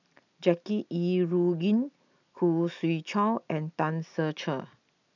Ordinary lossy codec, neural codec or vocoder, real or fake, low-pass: none; none; real; 7.2 kHz